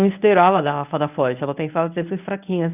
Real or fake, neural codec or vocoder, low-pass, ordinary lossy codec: fake; codec, 24 kHz, 0.9 kbps, WavTokenizer, medium speech release version 1; 3.6 kHz; none